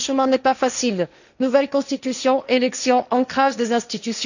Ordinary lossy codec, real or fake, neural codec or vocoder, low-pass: none; fake; codec, 16 kHz, 1.1 kbps, Voila-Tokenizer; 7.2 kHz